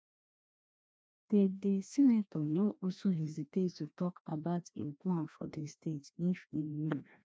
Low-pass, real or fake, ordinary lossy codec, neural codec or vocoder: none; fake; none; codec, 16 kHz, 1 kbps, FreqCodec, larger model